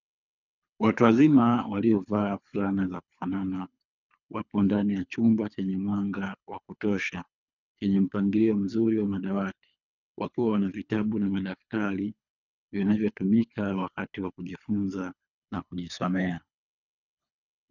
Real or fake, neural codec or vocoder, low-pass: fake; codec, 24 kHz, 3 kbps, HILCodec; 7.2 kHz